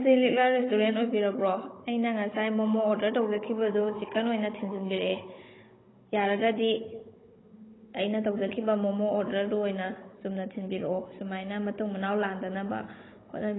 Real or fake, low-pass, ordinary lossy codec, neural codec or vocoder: fake; 7.2 kHz; AAC, 16 kbps; codec, 16 kHz, 16 kbps, FunCodec, trained on Chinese and English, 50 frames a second